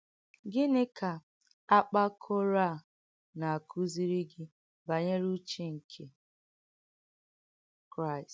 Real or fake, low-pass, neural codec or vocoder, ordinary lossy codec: real; none; none; none